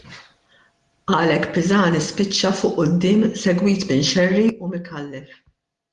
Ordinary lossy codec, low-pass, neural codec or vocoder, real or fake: Opus, 24 kbps; 10.8 kHz; none; real